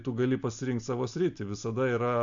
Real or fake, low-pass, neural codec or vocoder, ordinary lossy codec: real; 7.2 kHz; none; MP3, 64 kbps